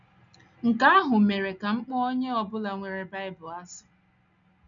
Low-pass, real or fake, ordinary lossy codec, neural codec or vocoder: 7.2 kHz; real; none; none